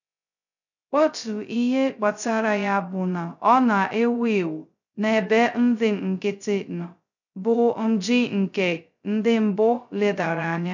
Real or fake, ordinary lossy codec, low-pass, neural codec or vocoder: fake; none; 7.2 kHz; codec, 16 kHz, 0.2 kbps, FocalCodec